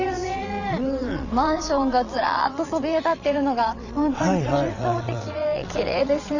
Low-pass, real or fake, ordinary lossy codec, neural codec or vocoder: 7.2 kHz; fake; none; vocoder, 22.05 kHz, 80 mel bands, WaveNeXt